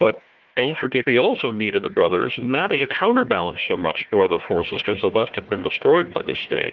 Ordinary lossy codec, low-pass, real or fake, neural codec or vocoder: Opus, 32 kbps; 7.2 kHz; fake; codec, 16 kHz, 1 kbps, FunCodec, trained on Chinese and English, 50 frames a second